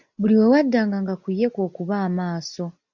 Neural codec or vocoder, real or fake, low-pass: none; real; 7.2 kHz